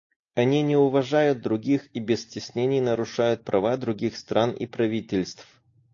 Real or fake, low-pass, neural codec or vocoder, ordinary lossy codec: real; 7.2 kHz; none; AAC, 48 kbps